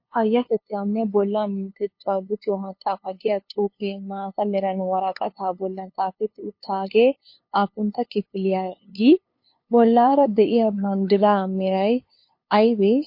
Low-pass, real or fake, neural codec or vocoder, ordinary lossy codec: 5.4 kHz; fake; codec, 16 kHz, 2 kbps, FunCodec, trained on LibriTTS, 25 frames a second; MP3, 32 kbps